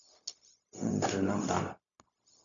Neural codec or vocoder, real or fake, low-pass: codec, 16 kHz, 0.4 kbps, LongCat-Audio-Codec; fake; 7.2 kHz